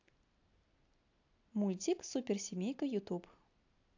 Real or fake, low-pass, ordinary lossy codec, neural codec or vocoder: real; 7.2 kHz; AAC, 48 kbps; none